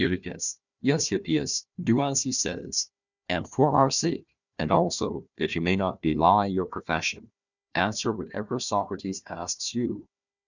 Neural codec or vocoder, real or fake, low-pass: codec, 16 kHz, 1 kbps, FunCodec, trained on Chinese and English, 50 frames a second; fake; 7.2 kHz